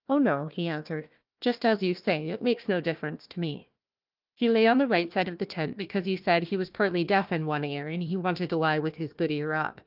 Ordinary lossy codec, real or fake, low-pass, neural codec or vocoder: Opus, 32 kbps; fake; 5.4 kHz; codec, 16 kHz, 1 kbps, FunCodec, trained on Chinese and English, 50 frames a second